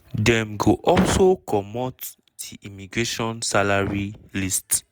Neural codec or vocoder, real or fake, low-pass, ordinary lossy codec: none; real; none; none